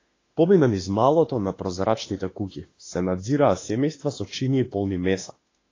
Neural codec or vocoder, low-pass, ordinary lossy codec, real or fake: autoencoder, 48 kHz, 32 numbers a frame, DAC-VAE, trained on Japanese speech; 7.2 kHz; AAC, 32 kbps; fake